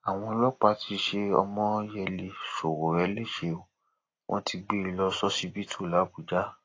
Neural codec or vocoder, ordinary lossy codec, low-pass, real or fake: none; AAC, 32 kbps; 7.2 kHz; real